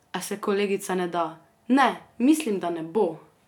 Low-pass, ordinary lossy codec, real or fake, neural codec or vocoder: 19.8 kHz; none; real; none